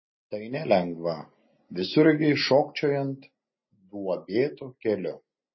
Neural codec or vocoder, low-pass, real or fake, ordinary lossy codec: none; 7.2 kHz; real; MP3, 24 kbps